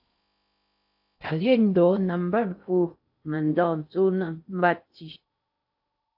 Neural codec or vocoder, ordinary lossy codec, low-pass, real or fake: codec, 16 kHz in and 24 kHz out, 0.6 kbps, FocalCodec, streaming, 4096 codes; AAC, 48 kbps; 5.4 kHz; fake